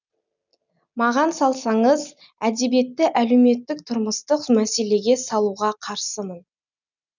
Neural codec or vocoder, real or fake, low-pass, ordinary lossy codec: none; real; 7.2 kHz; none